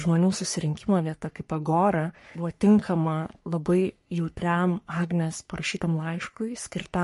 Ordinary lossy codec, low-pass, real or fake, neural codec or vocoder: MP3, 48 kbps; 14.4 kHz; fake; codec, 44.1 kHz, 3.4 kbps, Pupu-Codec